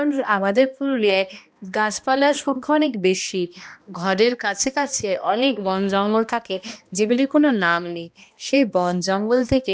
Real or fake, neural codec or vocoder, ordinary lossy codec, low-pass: fake; codec, 16 kHz, 1 kbps, X-Codec, HuBERT features, trained on balanced general audio; none; none